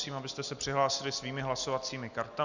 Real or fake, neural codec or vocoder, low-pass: real; none; 7.2 kHz